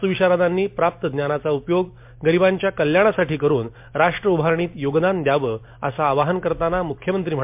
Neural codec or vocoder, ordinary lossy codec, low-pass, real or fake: none; MP3, 32 kbps; 3.6 kHz; real